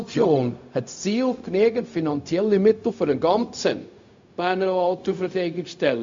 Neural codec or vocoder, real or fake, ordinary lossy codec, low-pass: codec, 16 kHz, 0.4 kbps, LongCat-Audio-Codec; fake; MP3, 48 kbps; 7.2 kHz